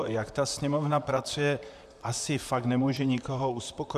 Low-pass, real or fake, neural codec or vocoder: 14.4 kHz; fake; vocoder, 44.1 kHz, 128 mel bands, Pupu-Vocoder